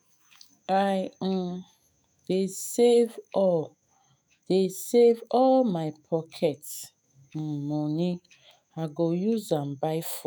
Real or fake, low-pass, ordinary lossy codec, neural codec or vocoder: fake; none; none; autoencoder, 48 kHz, 128 numbers a frame, DAC-VAE, trained on Japanese speech